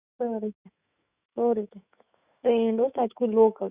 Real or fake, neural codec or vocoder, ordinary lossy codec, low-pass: fake; autoencoder, 48 kHz, 128 numbers a frame, DAC-VAE, trained on Japanese speech; Opus, 64 kbps; 3.6 kHz